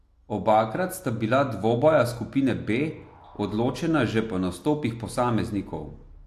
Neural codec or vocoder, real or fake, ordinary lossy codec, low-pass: none; real; AAC, 64 kbps; 14.4 kHz